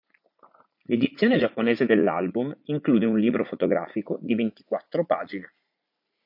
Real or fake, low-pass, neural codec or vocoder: fake; 5.4 kHz; vocoder, 44.1 kHz, 80 mel bands, Vocos